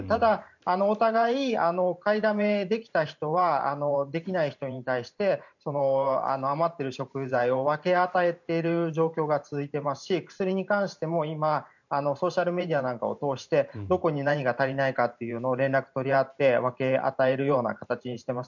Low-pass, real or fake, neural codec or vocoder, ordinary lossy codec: 7.2 kHz; fake; vocoder, 44.1 kHz, 128 mel bands every 512 samples, BigVGAN v2; none